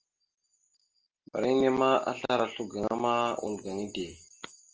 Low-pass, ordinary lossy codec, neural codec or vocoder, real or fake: 7.2 kHz; Opus, 24 kbps; none; real